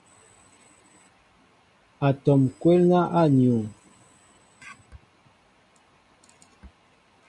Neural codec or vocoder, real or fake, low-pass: none; real; 10.8 kHz